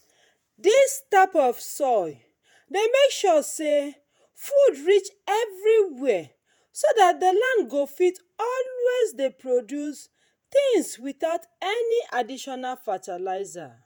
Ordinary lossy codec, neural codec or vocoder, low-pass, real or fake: none; vocoder, 48 kHz, 128 mel bands, Vocos; none; fake